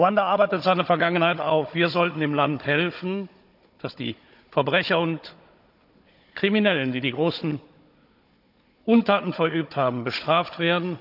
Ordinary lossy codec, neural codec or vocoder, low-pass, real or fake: none; codec, 16 kHz, 16 kbps, FunCodec, trained on Chinese and English, 50 frames a second; 5.4 kHz; fake